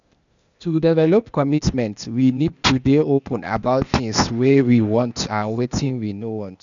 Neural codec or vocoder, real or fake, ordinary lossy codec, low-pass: codec, 16 kHz, 0.8 kbps, ZipCodec; fake; none; 7.2 kHz